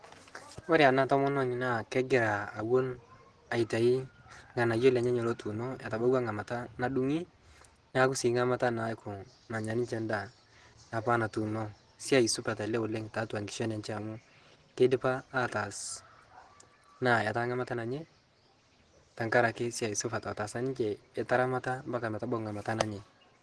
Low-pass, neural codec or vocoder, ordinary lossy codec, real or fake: 10.8 kHz; none; Opus, 16 kbps; real